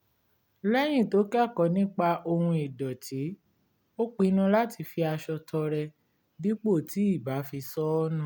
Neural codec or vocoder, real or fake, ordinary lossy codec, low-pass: none; real; none; none